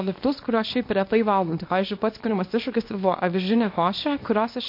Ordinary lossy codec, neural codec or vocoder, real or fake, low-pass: MP3, 32 kbps; codec, 24 kHz, 0.9 kbps, WavTokenizer, small release; fake; 5.4 kHz